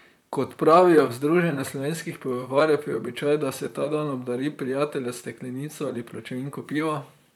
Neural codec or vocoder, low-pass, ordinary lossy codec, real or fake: vocoder, 44.1 kHz, 128 mel bands, Pupu-Vocoder; 19.8 kHz; none; fake